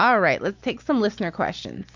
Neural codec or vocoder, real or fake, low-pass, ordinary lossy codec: none; real; 7.2 kHz; MP3, 64 kbps